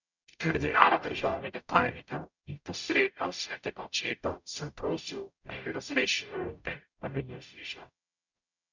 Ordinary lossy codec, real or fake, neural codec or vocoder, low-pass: none; fake; codec, 44.1 kHz, 0.9 kbps, DAC; 7.2 kHz